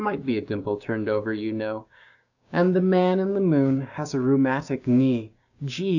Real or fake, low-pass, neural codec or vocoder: fake; 7.2 kHz; codec, 16 kHz, 6 kbps, DAC